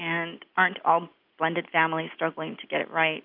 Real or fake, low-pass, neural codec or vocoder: real; 5.4 kHz; none